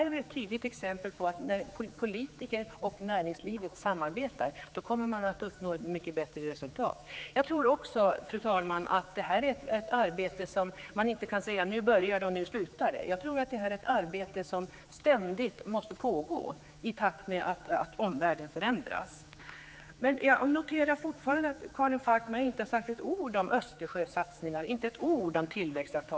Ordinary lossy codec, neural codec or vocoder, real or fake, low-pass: none; codec, 16 kHz, 4 kbps, X-Codec, HuBERT features, trained on general audio; fake; none